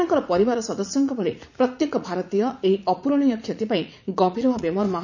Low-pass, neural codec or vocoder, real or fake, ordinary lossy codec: 7.2 kHz; vocoder, 44.1 kHz, 80 mel bands, Vocos; fake; none